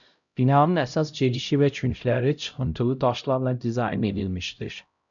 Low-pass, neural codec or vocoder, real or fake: 7.2 kHz; codec, 16 kHz, 0.5 kbps, X-Codec, HuBERT features, trained on LibriSpeech; fake